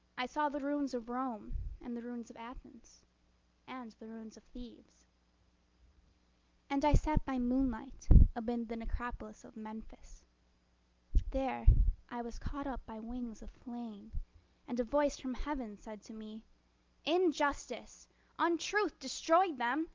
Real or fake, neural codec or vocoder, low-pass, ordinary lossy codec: real; none; 7.2 kHz; Opus, 24 kbps